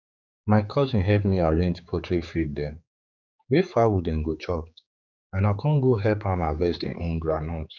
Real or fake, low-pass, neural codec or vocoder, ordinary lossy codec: fake; 7.2 kHz; codec, 16 kHz, 4 kbps, X-Codec, HuBERT features, trained on general audio; none